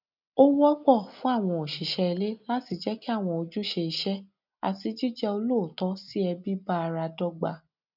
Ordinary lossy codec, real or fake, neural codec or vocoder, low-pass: none; real; none; 5.4 kHz